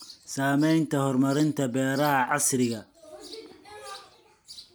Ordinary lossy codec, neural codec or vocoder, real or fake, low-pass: none; none; real; none